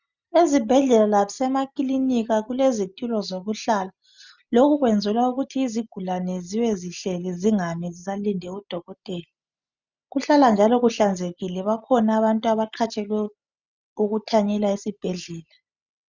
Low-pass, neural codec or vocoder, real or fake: 7.2 kHz; none; real